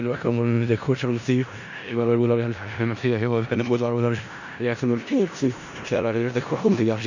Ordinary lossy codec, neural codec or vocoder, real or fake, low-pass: none; codec, 16 kHz in and 24 kHz out, 0.4 kbps, LongCat-Audio-Codec, four codebook decoder; fake; 7.2 kHz